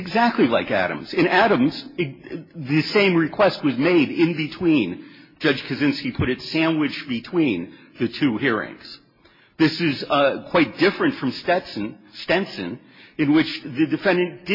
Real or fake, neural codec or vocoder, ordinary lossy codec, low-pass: real; none; MP3, 24 kbps; 5.4 kHz